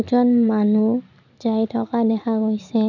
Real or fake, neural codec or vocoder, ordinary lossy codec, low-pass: real; none; none; 7.2 kHz